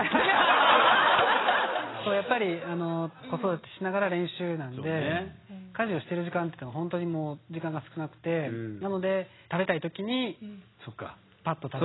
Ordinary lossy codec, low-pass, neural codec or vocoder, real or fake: AAC, 16 kbps; 7.2 kHz; none; real